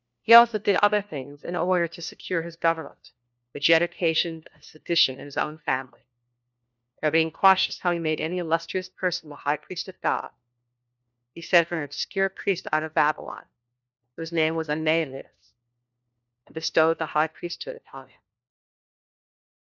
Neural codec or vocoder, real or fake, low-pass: codec, 16 kHz, 1 kbps, FunCodec, trained on LibriTTS, 50 frames a second; fake; 7.2 kHz